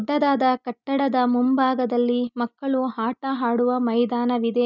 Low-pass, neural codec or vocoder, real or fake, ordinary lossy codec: 7.2 kHz; none; real; none